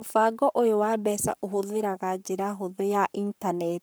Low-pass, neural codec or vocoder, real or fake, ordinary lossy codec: none; codec, 44.1 kHz, 7.8 kbps, Pupu-Codec; fake; none